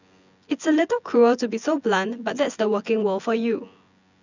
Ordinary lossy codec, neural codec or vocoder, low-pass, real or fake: none; vocoder, 24 kHz, 100 mel bands, Vocos; 7.2 kHz; fake